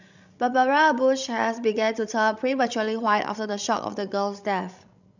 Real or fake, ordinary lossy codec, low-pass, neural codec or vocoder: fake; none; 7.2 kHz; codec, 16 kHz, 16 kbps, FreqCodec, larger model